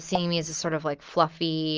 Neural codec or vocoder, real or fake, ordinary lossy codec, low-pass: none; real; Opus, 24 kbps; 7.2 kHz